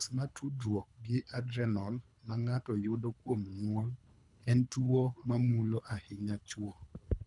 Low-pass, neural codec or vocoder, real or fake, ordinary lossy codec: 10.8 kHz; codec, 24 kHz, 3 kbps, HILCodec; fake; none